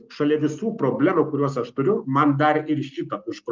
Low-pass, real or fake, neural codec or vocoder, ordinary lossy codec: 7.2 kHz; real; none; Opus, 24 kbps